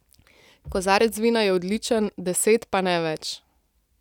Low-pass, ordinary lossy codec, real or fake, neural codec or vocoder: 19.8 kHz; none; real; none